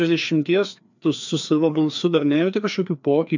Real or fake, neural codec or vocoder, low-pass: fake; codec, 16 kHz, 2 kbps, FreqCodec, larger model; 7.2 kHz